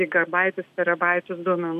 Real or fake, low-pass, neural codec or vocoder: real; 14.4 kHz; none